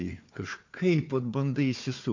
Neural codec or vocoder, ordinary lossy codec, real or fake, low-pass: codec, 16 kHz, 4 kbps, FunCodec, trained on Chinese and English, 50 frames a second; MP3, 48 kbps; fake; 7.2 kHz